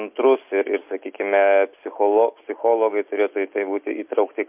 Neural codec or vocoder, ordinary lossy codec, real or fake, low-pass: none; MP3, 32 kbps; real; 3.6 kHz